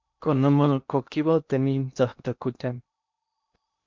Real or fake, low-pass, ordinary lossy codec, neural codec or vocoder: fake; 7.2 kHz; MP3, 64 kbps; codec, 16 kHz in and 24 kHz out, 0.8 kbps, FocalCodec, streaming, 65536 codes